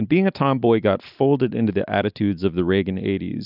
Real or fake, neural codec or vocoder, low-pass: real; none; 5.4 kHz